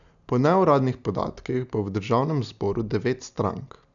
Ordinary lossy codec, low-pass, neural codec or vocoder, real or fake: none; 7.2 kHz; none; real